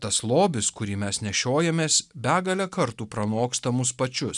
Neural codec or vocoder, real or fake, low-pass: none; real; 10.8 kHz